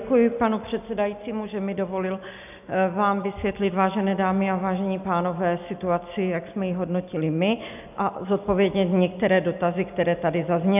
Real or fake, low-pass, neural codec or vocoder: real; 3.6 kHz; none